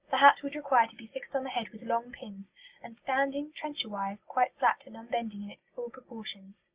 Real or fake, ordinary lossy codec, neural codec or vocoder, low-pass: real; Opus, 64 kbps; none; 3.6 kHz